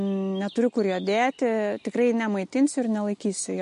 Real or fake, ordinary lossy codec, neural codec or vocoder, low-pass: real; MP3, 48 kbps; none; 10.8 kHz